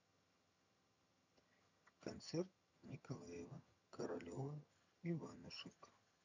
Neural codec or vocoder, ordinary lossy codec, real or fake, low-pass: vocoder, 22.05 kHz, 80 mel bands, HiFi-GAN; none; fake; 7.2 kHz